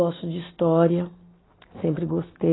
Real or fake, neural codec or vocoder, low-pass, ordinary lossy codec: real; none; 7.2 kHz; AAC, 16 kbps